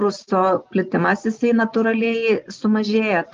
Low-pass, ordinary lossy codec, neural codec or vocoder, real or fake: 7.2 kHz; Opus, 24 kbps; none; real